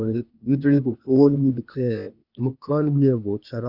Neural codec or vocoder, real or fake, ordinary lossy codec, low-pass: codec, 16 kHz, 0.8 kbps, ZipCodec; fake; none; 5.4 kHz